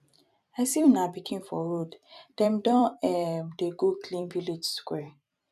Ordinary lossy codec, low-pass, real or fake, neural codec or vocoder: none; 14.4 kHz; fake; vocoder, 44.1 kHz, 128 mel bands every 256 samples, BigVGAN v2